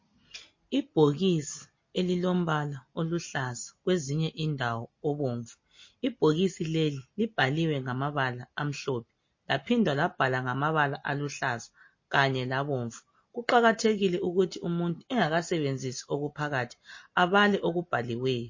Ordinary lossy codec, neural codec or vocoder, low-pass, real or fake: MP3, 32 kbps; none; 7.2 kHz; real